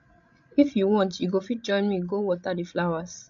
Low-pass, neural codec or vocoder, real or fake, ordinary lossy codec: 7.2 kHz; codec, 16 kHz, 16 kbps, FreqCodec, larger model; fake; AAC, 64 kbps